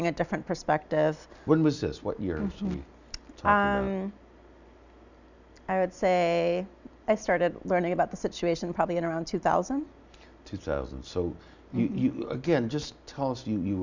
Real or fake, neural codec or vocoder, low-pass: real; none; 7.2 kHz